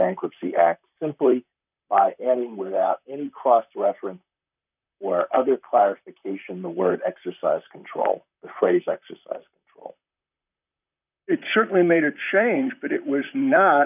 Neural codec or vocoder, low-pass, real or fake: vocoder, 44.1 kHz, 128 mel bands, Pupu-Vocoder; 3.6 kHz; fake